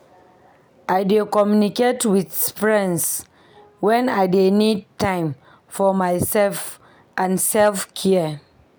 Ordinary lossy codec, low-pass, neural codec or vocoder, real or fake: none; none; none; real